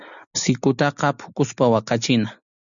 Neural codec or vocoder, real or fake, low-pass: none; real; 7.2 kHz